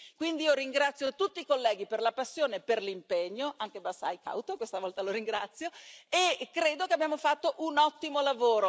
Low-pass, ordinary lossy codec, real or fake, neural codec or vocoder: none; none; real; none